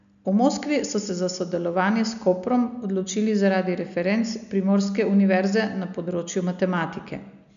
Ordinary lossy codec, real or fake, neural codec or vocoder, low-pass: none; real; none; 7.2 kHz